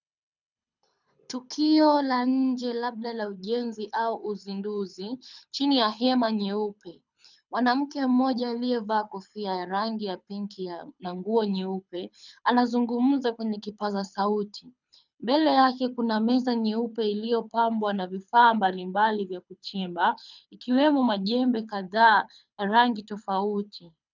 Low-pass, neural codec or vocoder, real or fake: 7.2 kHz; codec, 24 kHz, 6 kbps, HILCodec; fake